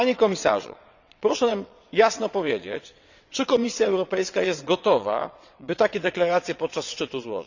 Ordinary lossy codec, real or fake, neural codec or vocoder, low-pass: none; fake; vocoder, 22.05 kHz, 80 mel bands, WaveNeXt; 7.2 kHz